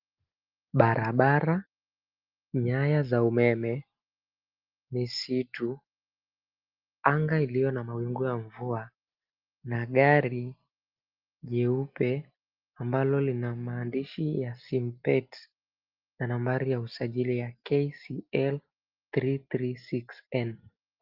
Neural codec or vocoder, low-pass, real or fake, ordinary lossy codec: none; 5.4 kHz; real; Opus, 32 kbps